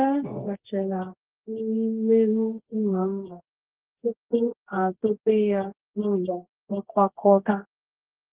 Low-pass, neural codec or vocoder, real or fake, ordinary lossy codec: 3.6 kHz; codec, 24 kHz, 0.9 kbps, WavTokenizer, medium music audio release; fake; Opus, 16 kbps